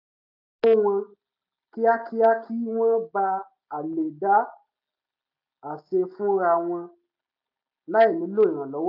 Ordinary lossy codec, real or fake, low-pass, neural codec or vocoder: AAC, 48 kbps; real; 5.4 kHz; none